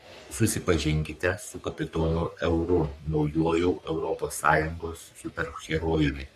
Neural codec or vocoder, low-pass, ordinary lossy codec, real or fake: codec, 44.1 kHz, 3.4 kbps, Pupu-Codec; 14.4 kHz; AAC, 96 kbps; fake